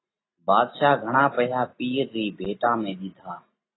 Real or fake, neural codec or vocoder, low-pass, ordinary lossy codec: real; none; 7.2 kHz; AAC, 16 kbps